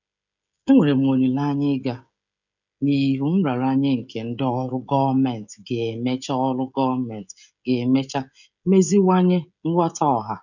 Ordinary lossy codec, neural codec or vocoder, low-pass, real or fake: none; codec, 16 kHz, 16 kbps, FreqCodec, smaller model; 7.2 kHz; fake